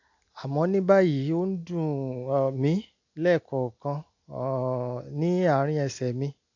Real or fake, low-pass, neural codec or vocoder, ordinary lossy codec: real; 7.2 kHz; none; AAC, 48 kbps